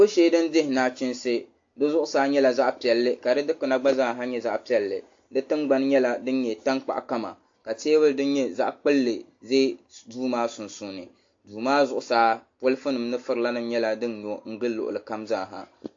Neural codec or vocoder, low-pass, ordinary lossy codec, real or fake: none; 7.2 kHz; AAC, 48 kbps; real